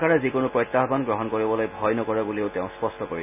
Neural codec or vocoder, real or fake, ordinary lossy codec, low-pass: none; real; none; 3.6 kHz